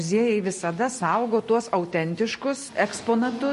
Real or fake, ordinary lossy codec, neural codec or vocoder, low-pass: real; MP3, 48 kbps; none; 14.4 kHz